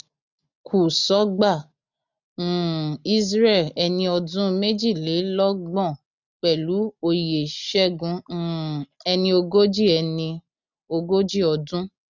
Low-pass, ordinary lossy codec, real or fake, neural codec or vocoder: 7.2 kHz; none; real; none